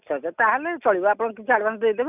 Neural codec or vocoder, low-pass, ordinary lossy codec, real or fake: none; 3.6 kHz; none; real